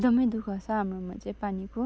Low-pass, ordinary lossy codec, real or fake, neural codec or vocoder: none; none; real; none